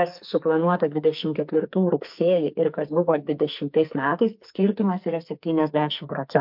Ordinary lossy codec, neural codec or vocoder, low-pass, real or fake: MP3, 48 kbps; codec, 44.1 kHz, 2.6 kbps, SNAC; 5.4 kHz; fake